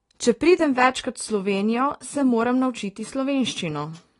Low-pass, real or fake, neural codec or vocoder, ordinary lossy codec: 10.8 kHz; real; none; AAC, 32 kbps